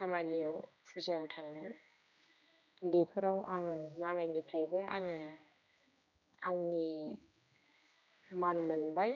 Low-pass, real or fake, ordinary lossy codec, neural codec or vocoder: 7.2 kHz; fake; none; codec, 16 kHz, 1 kbps, X-Codec, HuBERT features, trained on general audio